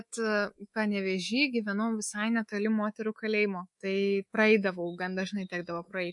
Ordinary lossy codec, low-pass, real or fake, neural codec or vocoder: MP3, 48 kbps; 10.8 kHz; fake; codec, 24 kHz, 3.1 kbps, DualCodec